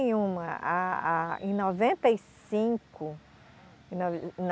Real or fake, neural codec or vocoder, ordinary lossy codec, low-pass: real; none; none; none